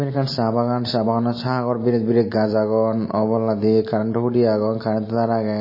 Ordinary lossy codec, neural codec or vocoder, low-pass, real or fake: MP3, 24 kbps; none; 5.4 kHz; real